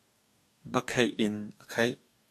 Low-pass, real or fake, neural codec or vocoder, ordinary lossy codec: 14.4 kHz; fake; codec, 44.1 kHz, 2.6 kbps, DAC; AAC, 96 kbps